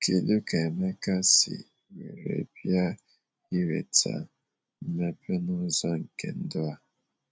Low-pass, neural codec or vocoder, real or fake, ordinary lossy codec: none; none; real; none